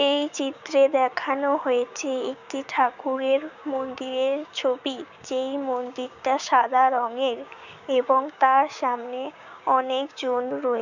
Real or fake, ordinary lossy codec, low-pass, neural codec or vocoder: fake; none; 7.2 kHz; codec, 16 kHz, 6 kbps, DAC